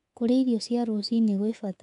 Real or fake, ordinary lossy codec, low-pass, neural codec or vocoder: fake; none; 10.8 kHz; codec, 24 kHz, 3.1 kbps, DualCodec